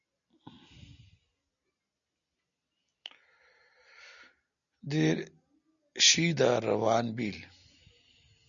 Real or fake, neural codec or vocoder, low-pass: real; none; 7.2 kHz